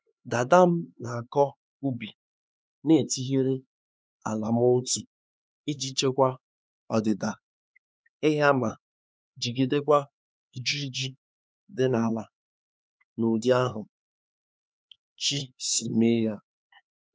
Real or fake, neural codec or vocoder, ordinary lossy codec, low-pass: fake; codec, 16 kHz, 4 kbps, X-Codec, HuBERT features, trained on LibriSpeech; none; none